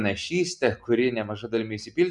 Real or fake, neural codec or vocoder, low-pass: real; none; 10.8 kHz